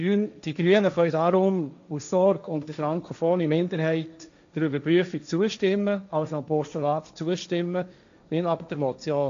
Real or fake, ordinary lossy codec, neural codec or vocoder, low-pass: fake; MP3, 64 kbps; codec, 16 kHz, 1.1 kbps, Voila-Tokenizer; 7.2 kHz